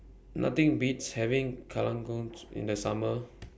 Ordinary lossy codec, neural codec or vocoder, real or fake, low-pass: none; none; real; none